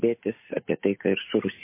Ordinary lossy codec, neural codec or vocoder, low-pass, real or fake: MP3, 24 kbps; none; 3.6 kHz; real